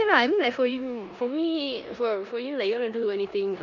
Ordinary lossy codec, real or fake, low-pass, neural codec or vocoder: none; fake; 7.2 kHz; codec, 16 kHz in and 24 kHz out, 0.9 kbps, LongCat-Audio-Codec, four codebook decoder